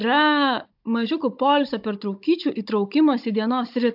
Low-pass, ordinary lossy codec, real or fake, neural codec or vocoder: 5.4 kHz; AAC, 48 kbps; fake; codec, 16 kHz, 16 kbps, FunCodec, trained on Chinese and English, 50 frames a second